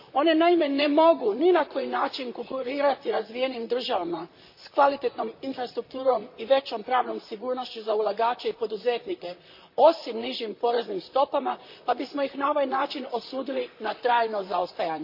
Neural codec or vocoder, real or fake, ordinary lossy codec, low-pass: vocoder, 44.1 kHz, 128 mel bands, Pupu-Vocoder; fake; MP3, 32 kbps; 5.4 kHz